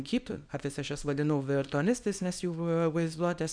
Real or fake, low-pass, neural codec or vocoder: fake; 9.9 kHz; codec, 24 kHz, 0.9 kbps, WavTokenizer, small release